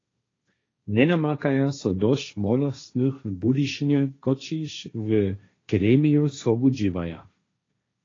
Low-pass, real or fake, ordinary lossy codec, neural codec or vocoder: 7.2 kHz; fake; AAC, 32 kbps; codec, 16 kHz, 1.1 kbps, Voila-Tokenizer